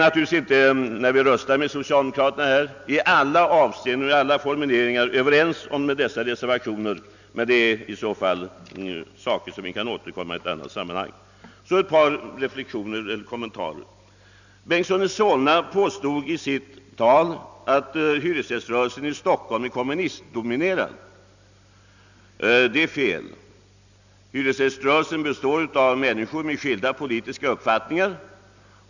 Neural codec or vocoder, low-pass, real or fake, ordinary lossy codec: none; 7.2 kHz; real; none